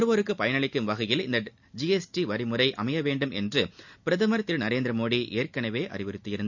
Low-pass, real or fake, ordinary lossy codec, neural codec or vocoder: 7.2 kHz; real; none; none